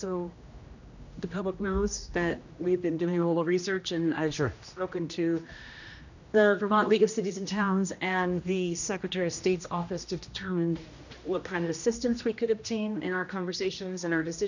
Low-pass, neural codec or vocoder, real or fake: 7.2 kHz; codec, 16 kHz, 1 kbps, X-Codec, HuBERT features, trained on general audio; fake